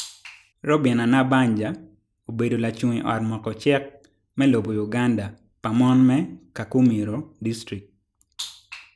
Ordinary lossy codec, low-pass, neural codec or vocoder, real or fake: none; none; none; real